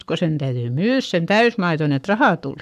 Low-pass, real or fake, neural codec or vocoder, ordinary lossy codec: 14.4 kHz; fake; codec, 44.1 kHz, 7.8 kbps, Pupu-Codec; none